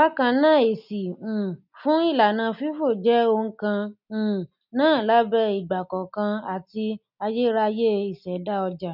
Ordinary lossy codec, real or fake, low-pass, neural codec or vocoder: none; real; 5.4 kHz; none